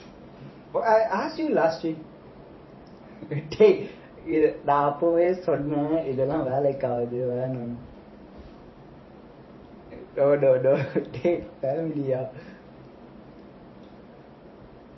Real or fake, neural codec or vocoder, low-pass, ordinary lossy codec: fake; vocoder, 44.1 kHz, 128 mel bands every 512 samples, BigVGAN v2; 7.2 kHz; MP3, 24 kbps